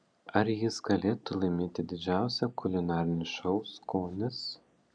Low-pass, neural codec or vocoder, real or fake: 9.9 kHz; none; real